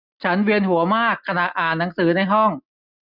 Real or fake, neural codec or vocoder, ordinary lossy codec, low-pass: real; none; none; 5.4 kHz